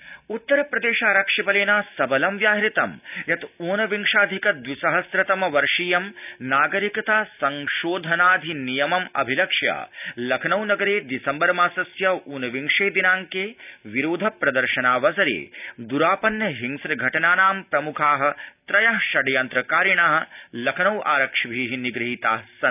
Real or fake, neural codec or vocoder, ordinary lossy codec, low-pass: real; none; none; 3.6 kHz